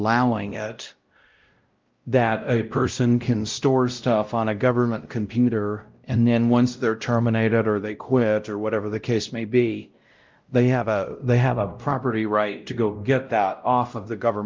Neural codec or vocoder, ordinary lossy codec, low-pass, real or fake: codec, 16 kHz, 0.5 kbps, X-Codec, WavLM features, trained on Multilingual LibriSpeech; Opus, 32 kbps; 7.2 kHz; fake